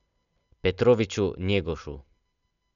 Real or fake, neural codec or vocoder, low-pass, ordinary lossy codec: real; none; 7.2 kHz; none